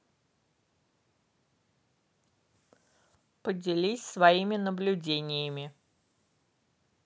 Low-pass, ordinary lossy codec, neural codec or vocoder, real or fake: none; none; none; real